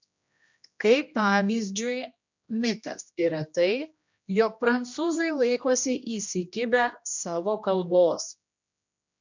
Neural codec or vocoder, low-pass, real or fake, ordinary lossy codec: codec, 16 kHz, 1 kbps, X-Codec, HuBERT features, trained on general audio; 7.2 kHz; fake; MP3, 64 kbps